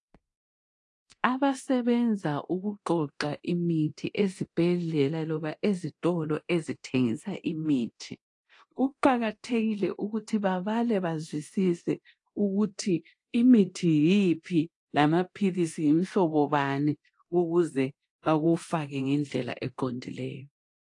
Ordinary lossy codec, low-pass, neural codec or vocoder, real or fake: AAC, 48 kbps; 10.8 kHz; codec, 24 kHz, 0.9 kbps, DualCodec; fake